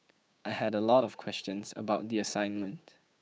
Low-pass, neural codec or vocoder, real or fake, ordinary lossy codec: none; codec, 16 kHz, 6 kbps, DAC; fake; none